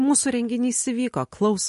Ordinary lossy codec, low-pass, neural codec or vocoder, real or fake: MP3, 48 kbps; 14.4 kHz; none; real